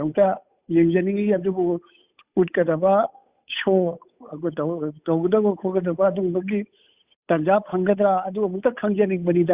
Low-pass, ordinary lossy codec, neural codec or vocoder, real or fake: 3.6 kHz; Opus, 64 kbps; codec, 16 kHz, 8 kbps, FunCodec, trained on Chinese and English, 25 frames a second; fake